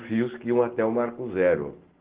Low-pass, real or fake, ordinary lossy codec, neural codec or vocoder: 3.6 kHz; real; Opus, 16 kbps; none